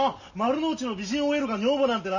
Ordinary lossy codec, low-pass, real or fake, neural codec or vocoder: none; 7.2 kHz; real; none